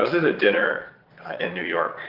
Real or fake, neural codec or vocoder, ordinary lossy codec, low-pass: fake; vocoder, 22.05 kHz, 80 mel bands, Vocos; Opus, 16 kbps; 5.4 kHz